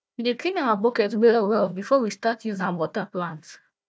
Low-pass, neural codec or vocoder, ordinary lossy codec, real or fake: none; codec, 16 kHz, 1 kbps, FunCodec, trained on Chinese and English, 50 frames a second; none; fake